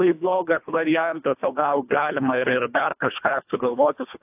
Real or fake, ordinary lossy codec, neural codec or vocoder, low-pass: fake; AAC, 32 kbps; codec, 24 kHz, 1.5 kbps, HILCodec; 3.6 kHz